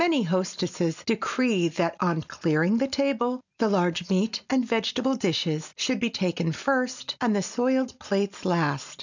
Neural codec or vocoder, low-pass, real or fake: none; 7.2 kHz; real